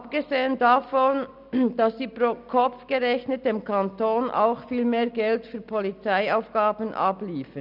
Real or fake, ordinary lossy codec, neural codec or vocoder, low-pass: real; none; none; 5.4 kHz